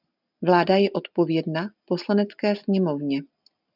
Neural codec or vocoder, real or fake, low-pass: none; real; 5.4 kHz